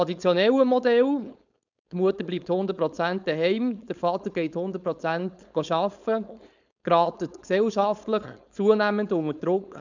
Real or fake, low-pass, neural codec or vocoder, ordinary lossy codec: fake; 7.2 kHz; codec, 16 kHz, 4.8 kbps, FACodec; none